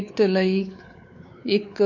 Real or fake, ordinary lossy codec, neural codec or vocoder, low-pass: fake; none; codec, 16 kHz, 4 kbps, X-Codec, WavLM features, trained on Multilingual LibriSpeech; 7.2 kHz